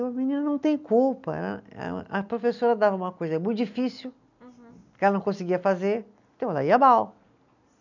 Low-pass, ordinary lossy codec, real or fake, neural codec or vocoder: 7.2 kHz; none; real; none